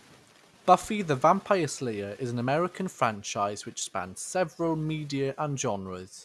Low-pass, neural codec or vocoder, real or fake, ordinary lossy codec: none; none; real; none